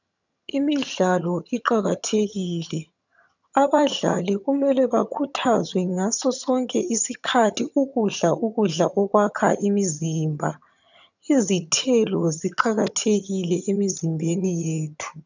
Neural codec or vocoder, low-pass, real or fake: vocoder, 22.05 kHz, 80 mel bands, HiFi-GAN; 7.2 kHz; fake